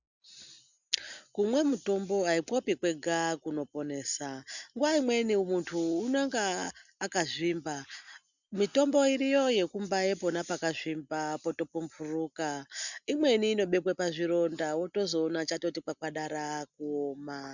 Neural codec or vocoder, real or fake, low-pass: none; real; 7.2 kHz